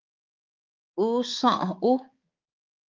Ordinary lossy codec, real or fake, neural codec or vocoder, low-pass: Opus, 32 kbps; fake; codec, 16 kHz, 4 kbps, X-Codec, HuBERT features, trained on balanced general audio; 7.2 kHz